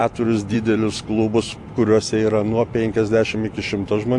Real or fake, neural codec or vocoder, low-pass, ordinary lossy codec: real; none; 10.8 kHz; AAC, 64 kbps